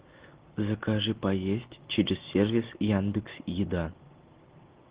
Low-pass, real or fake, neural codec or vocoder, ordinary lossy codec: 3.6 kHz; real; none; Opus, 16 kbps